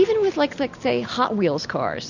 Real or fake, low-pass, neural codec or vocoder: real; 7.2 kHz; none